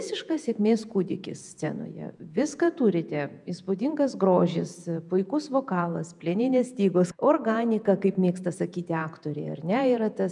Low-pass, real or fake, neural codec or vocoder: 10.8 kHz; fake; vocoder, 48 kHz, 128 mel bands, Vocos